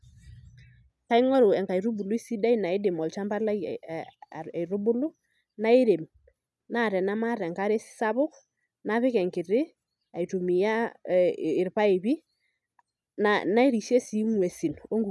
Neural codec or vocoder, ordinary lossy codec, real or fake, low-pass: none; none; real; none